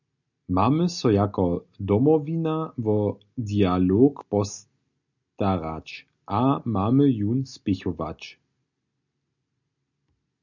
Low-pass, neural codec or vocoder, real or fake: 7.2 kHz; none; real